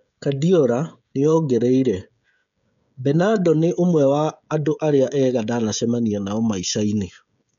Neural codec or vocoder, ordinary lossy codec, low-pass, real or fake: codec, 16 kHz, 16 kbps, FreqCodec, smaller model; none; 7.2 kHz; fake